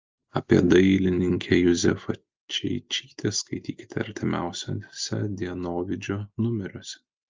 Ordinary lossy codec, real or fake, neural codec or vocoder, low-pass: Opus, 24 kbps; real; none; 7.2 kHz